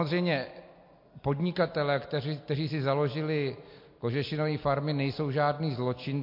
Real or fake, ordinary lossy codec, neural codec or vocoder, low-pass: real; MP3, 32 kbps; none; 5.4 kHz